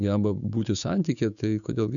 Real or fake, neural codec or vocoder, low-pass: fake; codec, 16 kHz, 6 kbps, DAC; 7.2 kHz